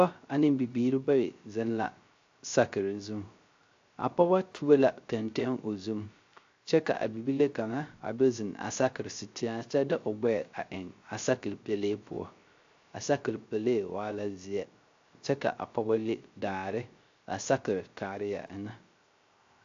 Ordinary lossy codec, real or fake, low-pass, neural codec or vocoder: AAC, 48 kbps; fake; 7.2 kHz; codec, 16 kHz, 0.3 kbps, FocalCodec